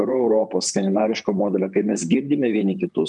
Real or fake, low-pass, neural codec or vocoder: fake; 10.8 kHz; vocoder, 44.1 kHz, 128 mel bands, Pupu-Vocoder